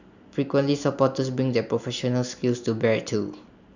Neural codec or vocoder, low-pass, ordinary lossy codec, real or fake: none; 7.2 kHz; none; real